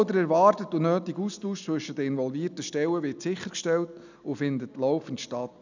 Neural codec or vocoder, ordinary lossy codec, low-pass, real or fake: none; none; 7.2 kHz; real